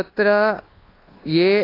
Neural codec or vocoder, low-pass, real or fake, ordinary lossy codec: codec, 24 kHz, 1.2 kbps, DualCodec; 5.4 kHz; fake; AAC, 24 kbps